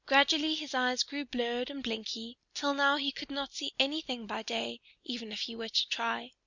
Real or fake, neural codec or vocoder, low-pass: real; none; 7.2 kHz